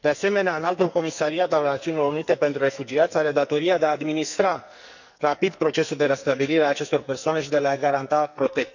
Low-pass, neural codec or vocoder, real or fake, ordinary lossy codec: 7.2 kHz; codec, 44.1 kHz, 2.6 kbps, SNAC; fake; none